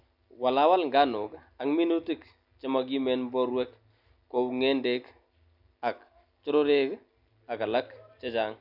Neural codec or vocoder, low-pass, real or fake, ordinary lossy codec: none; 5.4 kHz; real; none